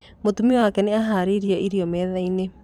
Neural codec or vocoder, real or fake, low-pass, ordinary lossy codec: vocoder, 44.1 kHz, 128 mel bands every 512 samples, BigVGAN v2; fake; 19.8 kHz; none